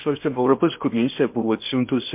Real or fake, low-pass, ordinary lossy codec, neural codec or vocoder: fake; 3.6 kHz; MP3, 32 kbps; codec, 16 kHz in and 24 kHz out, 0.8 kbps, FocalCodec, streaming, 65536 codes